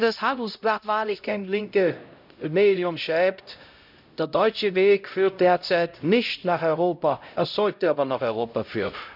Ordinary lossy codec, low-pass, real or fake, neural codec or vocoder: none; 5.4 kHz; fake; codec, 16 kHz, 0.5 kbps, X-Codec, HuBERT features, trained on LibriSpeech